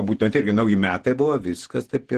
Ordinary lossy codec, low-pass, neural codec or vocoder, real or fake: Opus, 16 kbps; 14.4 kHz; vocoder, 48 kHz, 128 mel bands, Vocos; fake